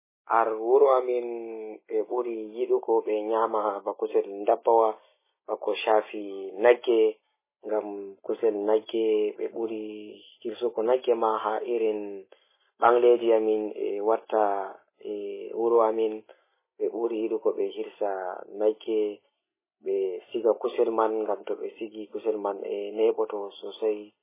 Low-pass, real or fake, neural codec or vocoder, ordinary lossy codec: 3.6 kHz; real; none; MP3, 16 kbps